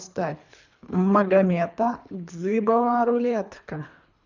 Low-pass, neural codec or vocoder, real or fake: 7.2 kHz; codec, 24 kHz, 3 kbps, HILCodec; fake